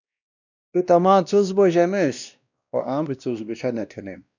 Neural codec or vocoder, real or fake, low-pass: codec, 16 kHz, 1 kbps, X-Codec, WavLM features, trained on Multilingual LibriSpeech; fake; 7.2 kHz